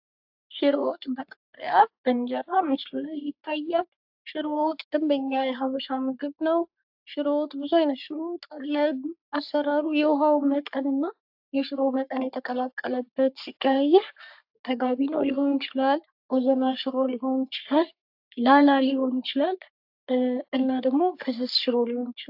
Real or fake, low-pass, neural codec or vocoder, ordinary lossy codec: fake; 5.4 kHz; codec, 44.1 kHz, 3.4 kbps, Pupu-Codec; AAC, 48 kbps